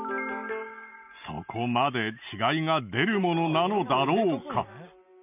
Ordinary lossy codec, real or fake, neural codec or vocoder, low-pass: none; real; none; 3.6 kHz